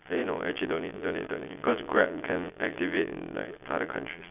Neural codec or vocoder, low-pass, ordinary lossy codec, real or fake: vocoder, 22.05 kHz, 80 mel bands, Vocos; 3.6 kHz; none; fake